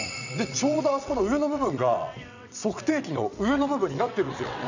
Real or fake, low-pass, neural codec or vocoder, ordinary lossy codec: fake; 7.2 kHz; vocoder, 22.05 kHz, 80 mel bands, WaveNeXt; none